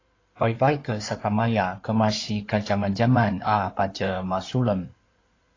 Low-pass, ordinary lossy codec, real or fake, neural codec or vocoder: 7.2 kHz; AAC, 32 kbps; fake; codec, 16 kHz in and 24 kHz out, 2.2 kbps, FireRedTTS-2 codec